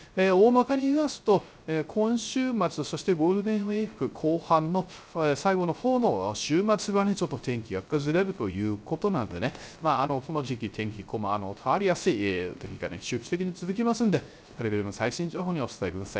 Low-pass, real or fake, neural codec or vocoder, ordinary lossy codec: none; fake; codec, 16 kHz, 0.3 kbps, FocalCodec; none